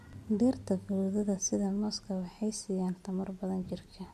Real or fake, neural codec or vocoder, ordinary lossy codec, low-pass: real; none; MP3, 64 kbps; 14.4 kHz